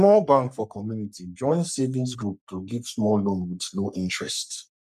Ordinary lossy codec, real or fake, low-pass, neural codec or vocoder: none; fake; 14.4 kHz; codec, 44.1 kHz, 3.4 kbps, Pupu-Codec